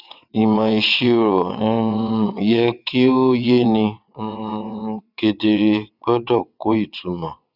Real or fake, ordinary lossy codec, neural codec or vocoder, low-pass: fake; none; vocoder, 22.05 kHz, 80 mel bands, WaveNeXt; 5.4 kHz